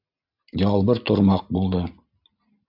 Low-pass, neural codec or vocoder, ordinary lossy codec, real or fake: 5.4 kHz; none; AAC, 48 kbps; real